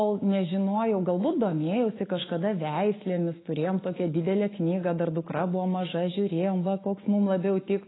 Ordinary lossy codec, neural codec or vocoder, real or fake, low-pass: AAC, 16 kbps; autoencoder, 48 kHz, 128 numbers a frame, DAC-VAE, trained on Japanese speech; fake; 7.2 kHz